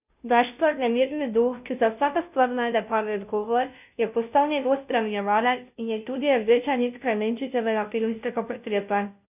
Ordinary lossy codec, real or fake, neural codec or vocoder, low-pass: none; fake; codec, 16 kHz, 0.5 kbps, FunCodec, trained on Chinese and English, 25 frames a second; 3.6 kHz